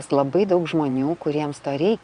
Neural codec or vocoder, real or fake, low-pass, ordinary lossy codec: vocoder, 22.05 kHz, 80 mel bands, Vocos; fake; 9.9 kHz; Opus, 64 kbps